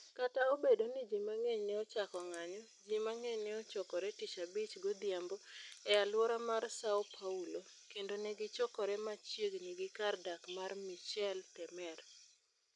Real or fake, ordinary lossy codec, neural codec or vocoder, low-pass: real; none; none; 10.8 kHz